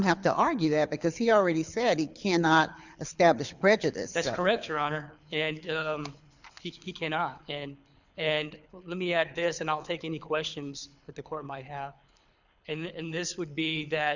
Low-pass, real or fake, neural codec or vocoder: 7.2 kHz; fake; codec, 24 kHz, 6 kbps, HILCodec